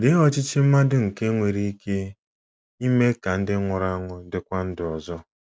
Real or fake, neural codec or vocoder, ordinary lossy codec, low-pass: real; none; none; none